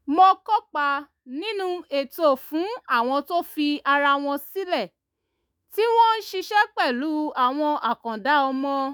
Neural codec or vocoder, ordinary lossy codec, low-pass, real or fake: autoencoder, 48 kHz, 128 numbers a frame, DAC-VAE, trained on Japanese speech; none; none; fake